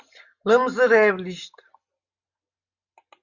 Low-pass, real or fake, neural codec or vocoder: 7.2 kHz; real; none